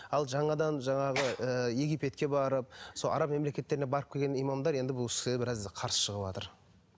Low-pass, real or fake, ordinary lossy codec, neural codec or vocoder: none; real; none; none